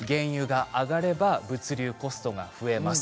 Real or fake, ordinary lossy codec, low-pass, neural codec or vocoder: real; none; none; none